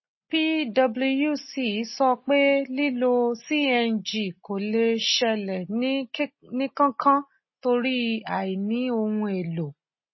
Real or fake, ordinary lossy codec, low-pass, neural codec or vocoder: real; MP3, 24 kbps; 7.2 kHz; none